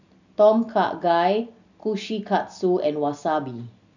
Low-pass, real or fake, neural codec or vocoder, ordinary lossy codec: 7.2 kHz; real; none; none